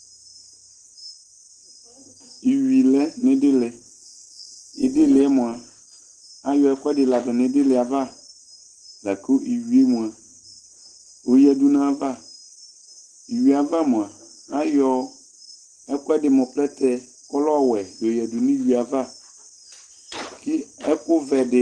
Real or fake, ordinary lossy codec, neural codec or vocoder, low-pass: real; Opus, 16 kbps; none; 9.9 kHz